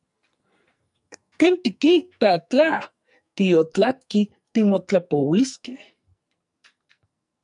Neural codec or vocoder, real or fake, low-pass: codec, 44.1 kHz, 2.6 kbps, SNAC; fake; 10.8 kHz